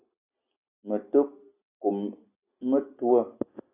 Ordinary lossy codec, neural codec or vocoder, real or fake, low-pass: AAC, 24 kbps; none; real; 3.6 kHz